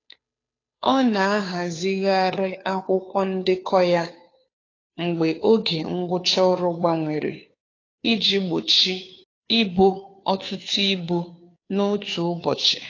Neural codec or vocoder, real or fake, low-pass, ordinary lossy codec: codec, 16 kHz, 2 kbps, FunCodec, trained on Chinese and English, 25 frames a second; fake; 7.2 kHz; AAC, 32 kbps